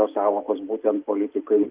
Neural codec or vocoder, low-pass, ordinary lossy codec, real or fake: none; 3.6 kHz; Opus, 32 kbps; real